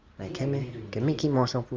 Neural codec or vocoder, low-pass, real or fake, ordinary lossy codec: none; 7.2 kHz; real; Opus, 32 kbps